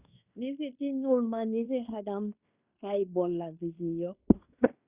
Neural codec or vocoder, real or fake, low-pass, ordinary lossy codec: codec, 16 kHz in and 24 kHz out, 0.9 kbps, LongCat-Audio-Codec, fine tuned four codebook decoder; fake; 3.6 kHz; Opus, 64 kbps